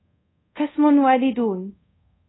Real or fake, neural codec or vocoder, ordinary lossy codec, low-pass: fake; codec, 24 kHz, 0.5 kbps, DualCodec; AAC, 16 kbps; 7.2 kHz